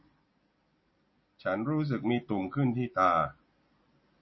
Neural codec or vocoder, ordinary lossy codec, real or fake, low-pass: none; MP3, 24 kbps; real; 7.2 kHz